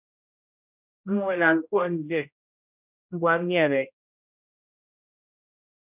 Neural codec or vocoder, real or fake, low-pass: codec, 16 kHz, 0.5 kbps, X-Codec, HuBERT features, trained on general audio; fake; 3.6 kHz